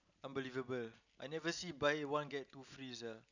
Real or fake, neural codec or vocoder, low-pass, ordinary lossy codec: real; none; 7.2 kHz; none